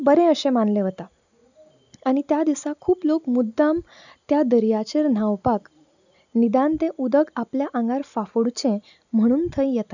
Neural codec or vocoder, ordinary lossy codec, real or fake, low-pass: none; none; real; 7.2 kHz